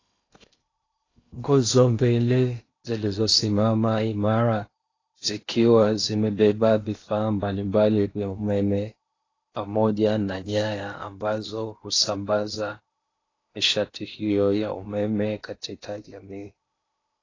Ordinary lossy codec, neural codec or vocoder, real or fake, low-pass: AAC, 32 kbps; codec, 16 kHz in and 24 kHz out, 0.8 kbps, FocalCodec, streaming, 65536 codes; fake; 7.2 kHz